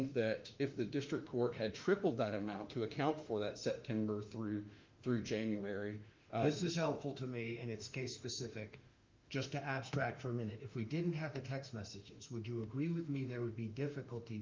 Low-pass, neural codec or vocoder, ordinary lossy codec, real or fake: 7.2 kHz; autoencoder, 48 kHz, 32 numbers a frame, DAC-VAE, trained on Japanese speech; Opus, 24 kbps; fake